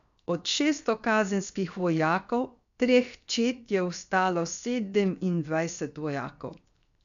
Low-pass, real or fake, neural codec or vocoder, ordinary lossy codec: 7.2 kHz; fake; codec, 16 kHz, 0.7 kbps, FocalCodec; none